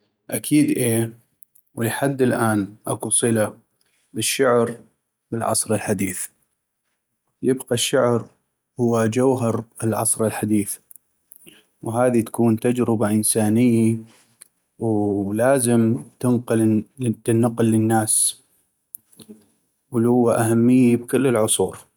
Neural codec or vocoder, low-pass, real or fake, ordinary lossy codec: none; none; real; none